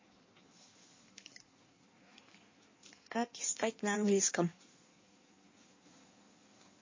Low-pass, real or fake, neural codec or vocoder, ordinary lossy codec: 7.2 kHz; fake; codec, 16 kHz in and 24 kHz out, 1.1 kbps, FireRedTTS-2 codec; MP3, 32 kbps